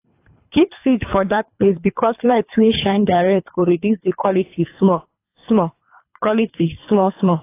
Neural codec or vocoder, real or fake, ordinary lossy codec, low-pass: codec, 24 kHz, 3 kbps, HILCodec; fake; AAC, 24 kbps; 3.6 kHz